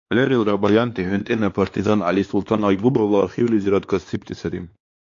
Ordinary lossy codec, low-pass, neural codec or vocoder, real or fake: AAC, 48 kbps; 7.2 kHz; codec, 16 kHz, 2 kbps, X-Codec, WavLM features, trained on Multilingual LibriSpeech; fake